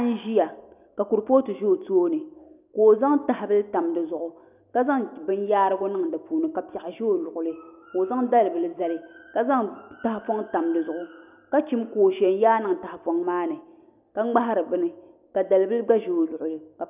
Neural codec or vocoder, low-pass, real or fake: none; 3.6 kHz; real